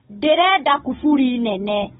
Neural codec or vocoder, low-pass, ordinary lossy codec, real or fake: codec, 16 kHz, 16 kbps, FunCodec, trained on Chinese and English, 50 frames a second; 7.2 kHz; AAC, 16 kbps; fake